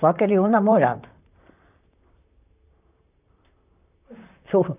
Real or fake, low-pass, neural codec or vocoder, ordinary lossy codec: fake; 3.6 kHz; vocoder, 44.1 kHz, 128 mel bands, Pupu-Vocoder; none